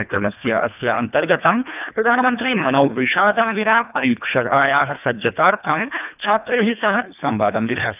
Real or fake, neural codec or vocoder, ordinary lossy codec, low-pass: fake; codec, 24 kHz, 1.5 kbps, HILCodec; none; 3.6 kHz